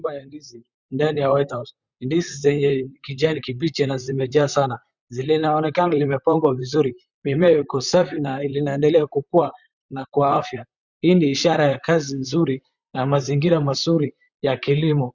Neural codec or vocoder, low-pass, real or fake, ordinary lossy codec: vocoder, 44.1 kHz, 128 mel bands, Pupu-Vocoder; 7.2 kHz; fake; Opus, 64 kbps